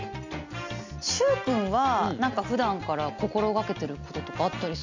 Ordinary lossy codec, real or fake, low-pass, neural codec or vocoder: MP3, 64 kbps; real; 7.2 kHz; none